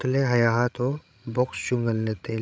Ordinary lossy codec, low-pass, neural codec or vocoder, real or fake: none; none; codec, 16 kHz, 16 kbps, FreqCodec, larger model; fake